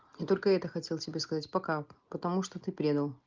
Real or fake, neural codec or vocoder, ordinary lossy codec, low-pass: real; none; Opus, 24 kbps; 7.2 kHz